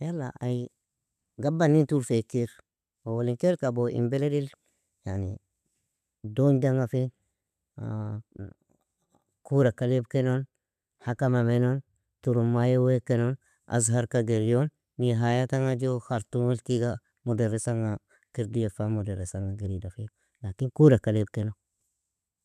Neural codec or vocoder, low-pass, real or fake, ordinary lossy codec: none; 14.4 kHz; real; none